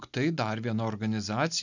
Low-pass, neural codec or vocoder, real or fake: 7.2 kHz; none; real